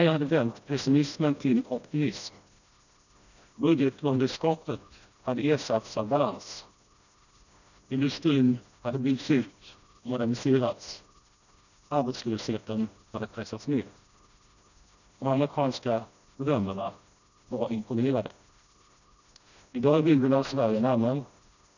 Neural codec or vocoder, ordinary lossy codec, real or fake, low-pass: codec, 16 kHz, 1 kbps, FreqCodec, smaller model; none; fake; 7.2 kHz